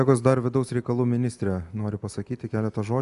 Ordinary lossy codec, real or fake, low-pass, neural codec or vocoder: AAC, 96 kbps; real; 10.8 kHz; none